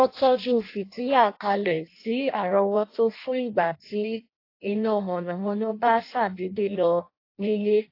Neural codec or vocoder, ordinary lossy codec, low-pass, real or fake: codec, 16 kHz in and 24 kHz out, 0.6 kbps, FireRedTTS-2 codec; AAC, 32 kbps; 5.4 kHz; fake